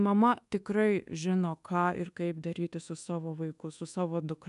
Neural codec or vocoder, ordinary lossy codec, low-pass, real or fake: codec, 24 kHz, 1.2 kbps, DualCodec; MP3, 96 kbps; 10.8 kHz; fake